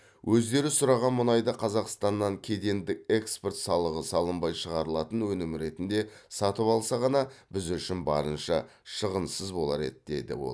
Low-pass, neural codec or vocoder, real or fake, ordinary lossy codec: none; none; real; none